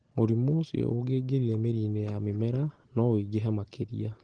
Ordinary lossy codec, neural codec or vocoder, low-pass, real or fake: Opus, 16 kbps; none; 9.9 kHz; real